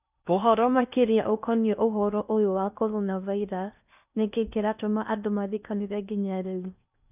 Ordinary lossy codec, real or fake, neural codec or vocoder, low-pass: none; fake; codec, 16 kHz in and 24 kHz out, 0.6 kbps, FocalCodec, streaming, 4096 codes; 3.6 kHz